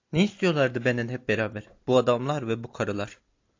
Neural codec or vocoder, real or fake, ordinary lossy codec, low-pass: none; real; AAC, 48 kbps; 7.2 kHz